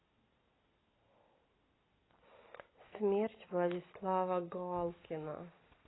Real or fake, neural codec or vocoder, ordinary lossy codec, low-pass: real; none; AAC, 16 kbps; 7.2 kHz